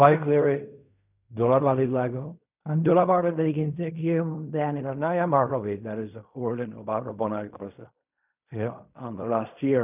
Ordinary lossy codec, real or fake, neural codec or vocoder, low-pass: none; fake; codec, 16 kHz in and 24 kHz out, 0.4 kbps, LongCat-Audio-Codec, fine tuned four codebook decoder; 3.6 kHz